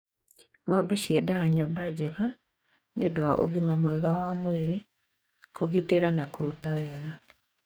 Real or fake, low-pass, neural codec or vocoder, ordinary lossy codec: fake; none; codec, 44.1 kHz, 2.6 kbps, DAC; none